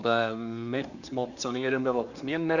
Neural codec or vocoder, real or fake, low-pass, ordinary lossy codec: codec, 24 kHz, 1 kbps, SNAC; fake; 7.2 kHz; none